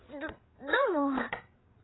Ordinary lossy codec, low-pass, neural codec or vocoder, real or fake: AAC, 16 kbps; 7.2 kHz; codec, 16 kHz, 8 kbps, FreqCodec, larger model; fake